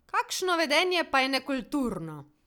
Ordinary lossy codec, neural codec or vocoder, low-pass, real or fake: none; none; 19.8 kHz; real